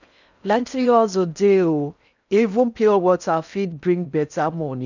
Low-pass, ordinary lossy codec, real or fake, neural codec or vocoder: 7.2 kHz; none; fake; codec, 16 kHz in and 24 kHz out, 0.6 kbps, FocalCodec, streaming, 4096 codes